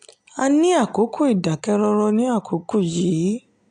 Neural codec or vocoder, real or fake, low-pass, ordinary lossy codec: none; real; 9.9 kHz; AAC, 64 kbps